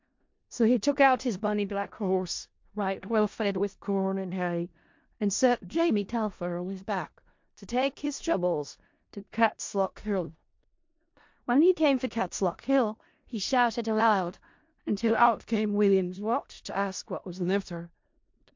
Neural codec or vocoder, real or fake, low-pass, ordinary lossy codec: codec, 16 kHz in and 24 kHz out, 0.4 kbps, LongCat-Audio-Codec, four codebook decoder; fake; 7.2 kHz; MP3, 48 kbps